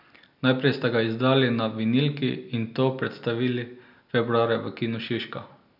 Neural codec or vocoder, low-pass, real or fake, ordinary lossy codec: none; 5.4 kHz; real; none